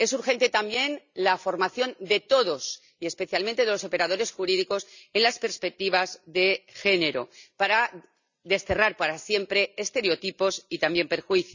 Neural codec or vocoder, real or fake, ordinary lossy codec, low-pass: none; real; none; 7.2 kHz